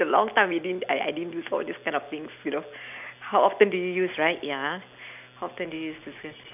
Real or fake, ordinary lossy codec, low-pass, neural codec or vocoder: real; none; 3.6 kHz; none